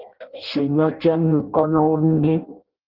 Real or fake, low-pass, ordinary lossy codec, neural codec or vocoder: fake; 5.4 kHz; Opus, 32 kbps; codec, 16 kHz in and 24 kHz out, 0.6 kbps, FireRedTTS-2 codec